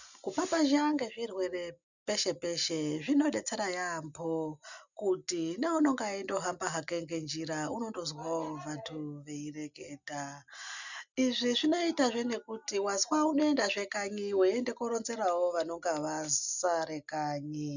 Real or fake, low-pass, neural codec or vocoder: real; 7.2 kHz; none